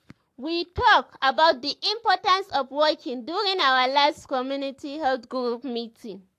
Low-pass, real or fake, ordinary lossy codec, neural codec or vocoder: 14.4 kHz; fake; AAC, 64 kbps; codec, 44.1 kHz, 7.8 kbps, Pupu-Codec